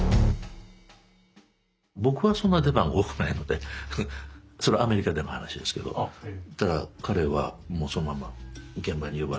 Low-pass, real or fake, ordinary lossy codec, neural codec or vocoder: none; real; none; none